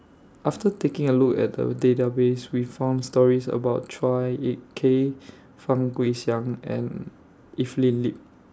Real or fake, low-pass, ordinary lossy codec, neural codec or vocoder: real; none; none; none